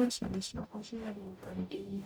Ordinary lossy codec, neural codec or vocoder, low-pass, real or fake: none; codec, 44.1 kHz, 0.9 kbps, DAC; none; fake